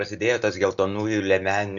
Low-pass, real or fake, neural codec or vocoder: 7.2 kHz; real; none